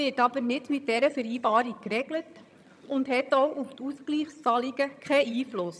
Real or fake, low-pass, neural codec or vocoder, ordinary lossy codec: fake; none; vocoder, 22.05 kHz, 80 mel bands, HiFi-GAN; none